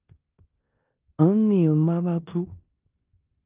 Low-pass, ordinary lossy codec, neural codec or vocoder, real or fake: 3.6 kHz; Opus, 24 kbps; codec, 16 kHz in and 24 kHz out, 0.9 kbps, LongCat-Audio-Codec, four codebook decoder; fake